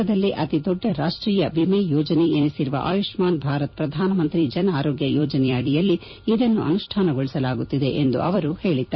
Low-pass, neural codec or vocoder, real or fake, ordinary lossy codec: 7.2 kHz; vocoder, 44.1 kHz, 128 mel bands every 256 samples, BigVGAN v2; fake; MP3, 24 kbps